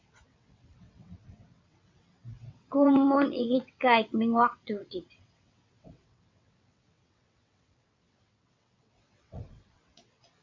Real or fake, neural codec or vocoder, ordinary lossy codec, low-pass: fake; vocoder, 22.05 kHz, 80 mel bands, WaveNeXt; MP3, 48 kbps; 7.2 kHz